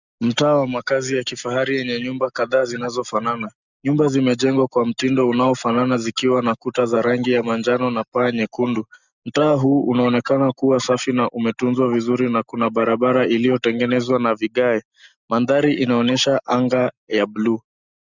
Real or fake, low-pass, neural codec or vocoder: real; 7.2 kHz; none